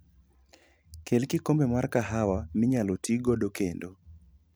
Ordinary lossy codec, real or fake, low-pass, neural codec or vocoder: none; real; none; none